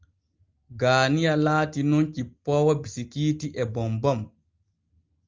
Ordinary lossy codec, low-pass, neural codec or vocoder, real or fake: Opus, 24 kbps; 7.2 kHz; none; real